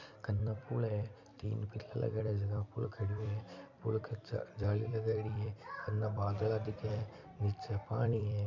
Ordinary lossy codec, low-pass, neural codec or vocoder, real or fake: MP3, 64 kbps; 7.2 kHz; vocoder, 44.1 kHz, 128 mel bands every 256 samples, BigVGAN v2; fake